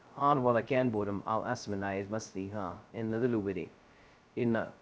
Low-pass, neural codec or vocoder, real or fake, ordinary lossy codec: none; codec, 16 kHz, 0.2 kbps, FocalCodec; fake; none